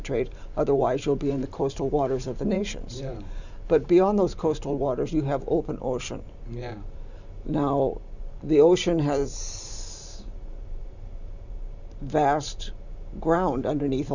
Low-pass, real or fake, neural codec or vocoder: 7.2 kHz; fake; vocoder, 44.1 kHz, 128 mel bands every 512 samples, BigVGAN v2